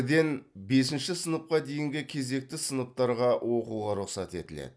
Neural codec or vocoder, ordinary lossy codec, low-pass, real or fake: none; none; none; real